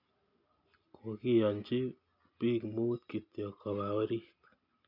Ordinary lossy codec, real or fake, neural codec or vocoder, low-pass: none; real; none; 5.4 kHz